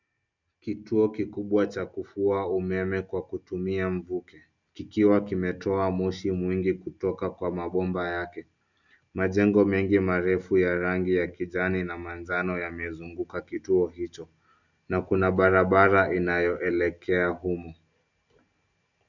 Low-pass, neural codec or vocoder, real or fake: 7.2 kHz; none; real